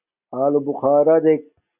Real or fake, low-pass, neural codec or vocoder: real; 3.6 kHz; none